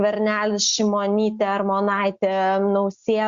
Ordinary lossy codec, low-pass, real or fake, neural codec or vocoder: Opus, 64 kbps; 7.2 kHz; real; none